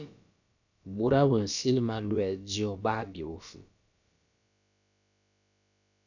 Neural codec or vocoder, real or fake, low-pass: codec, 16 kHz, about 1 kbps, DyCAST, with the encoder's durations; fake; 7.2 kHz